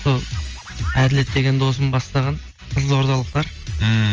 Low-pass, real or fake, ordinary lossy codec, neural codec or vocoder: 7.2 kHz; real; Opus, 24 kbps; none